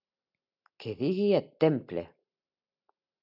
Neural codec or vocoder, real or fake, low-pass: none; real; 5.4 kHz